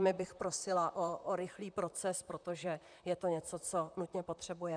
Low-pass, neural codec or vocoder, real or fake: 9.9 kHz; vocoder, 44.1 kHz, 128 mel bands, Pupu-Vocoder; fake